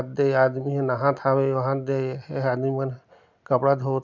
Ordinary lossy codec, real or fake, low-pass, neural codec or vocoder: none; real; 7.2 kHz; none